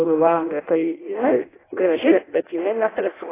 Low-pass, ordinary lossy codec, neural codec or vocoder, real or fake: 3.6 kHz; AAC, 16 kbps; codec, 16 kHz in and 24 kHz out, 0.6 kbps, FireRedTTS-2 codec; fake